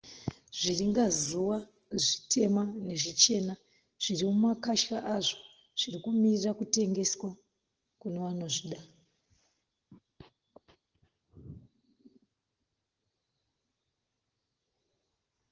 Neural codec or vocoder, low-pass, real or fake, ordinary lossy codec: none; 7.2 kHz; real; Opus, 16 kbps